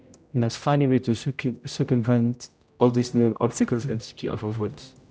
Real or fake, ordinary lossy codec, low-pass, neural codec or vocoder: fake; none; none; codec, 16 kHz, 0.5 kbps, X-Codec, HuBERT features, trained on general audio